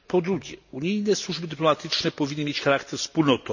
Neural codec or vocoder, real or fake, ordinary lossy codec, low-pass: none; real; none; 7.2 kHz